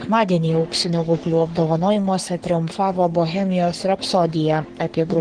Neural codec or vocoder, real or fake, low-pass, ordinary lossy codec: codec, 44.1 kHz, 3.4 kbps, Pupu-Codec; fake; 9.9 kHz; Opus, 16 kbps